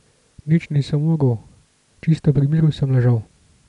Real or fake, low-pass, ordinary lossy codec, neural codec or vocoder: real; 10.8 kHz; none; none